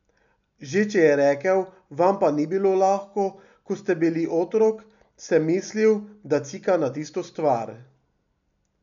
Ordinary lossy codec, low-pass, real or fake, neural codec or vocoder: none; 7.2 kHz; real; none